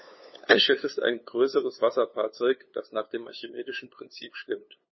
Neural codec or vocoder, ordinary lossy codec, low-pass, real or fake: codec, 16 kHz, 2 kbps, FunCodec, trained on LibriTTS, 25 frames a second; MP3, 24 kbps; 7.2 kHz; fake